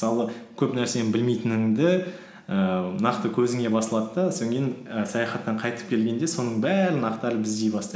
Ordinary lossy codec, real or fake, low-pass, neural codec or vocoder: none; real; none; none